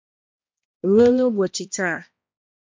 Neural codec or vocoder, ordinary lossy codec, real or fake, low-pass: codec, 16 kHz, 1 kbps, X-Codec, HuBERT features, trained on balanced general audio; MP3, 48 kbps; fake; 7.2 kHz